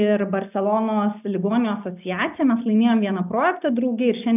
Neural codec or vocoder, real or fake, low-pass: none; real; 3.6 kHz